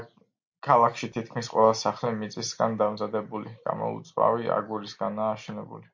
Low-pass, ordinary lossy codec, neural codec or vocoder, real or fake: 7.2 kHz; MP3, 48 kbps; none; real